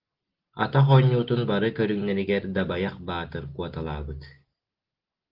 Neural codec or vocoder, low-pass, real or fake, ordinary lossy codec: none; 5.4 kHz; real; Opus, 16 kbps